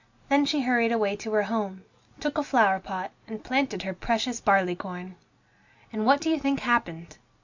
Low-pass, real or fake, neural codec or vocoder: 7.2 kHz; real; none